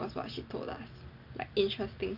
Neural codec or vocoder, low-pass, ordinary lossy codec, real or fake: none; 5.4 kHz; none; real